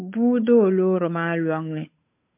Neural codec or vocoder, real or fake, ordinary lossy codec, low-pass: codec, 16 kHz, 8 kbps, FunCodec, trained on Chinese and English, 25 frames a second; fake; MP3, 24 kbps; 3.6 kHz